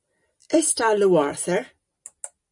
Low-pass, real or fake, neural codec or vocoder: 10.8 kHz; real; none